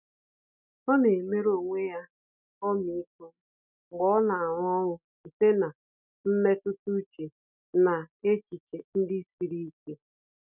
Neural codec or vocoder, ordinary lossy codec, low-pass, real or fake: none; none; 3.6 kHz; real